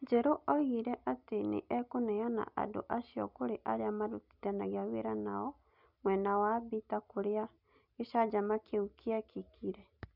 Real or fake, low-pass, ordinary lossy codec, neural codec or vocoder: real; 5.4 kHz; none; none